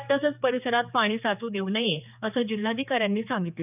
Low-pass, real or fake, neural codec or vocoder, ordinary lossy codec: 3.6 kHz; fake; codec, 16 kHz, 4 kbps, X-Codec, HuBERT features, trained on general audio; none